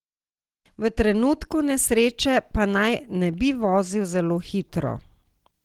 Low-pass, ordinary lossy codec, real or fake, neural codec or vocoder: 19.8 kHz; Opus, 16 kbps; real; none